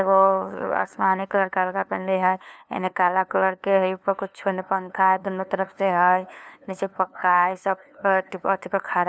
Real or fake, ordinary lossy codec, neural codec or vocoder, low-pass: fake; none; codec, 16 kHz, 2 kbps, FunCodec, trained on LibriTTS, 25 frames a second; none